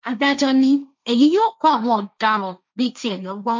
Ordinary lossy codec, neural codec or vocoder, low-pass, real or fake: MP3, 64 kbps; codec, 16 kHz, 1.1 kbps, Voila-Tokenizer; 7.2 kHz; fake